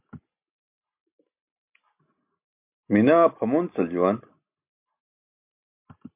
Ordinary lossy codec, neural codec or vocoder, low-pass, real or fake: MP3, 32 kbps; none; 3.6 kHz; real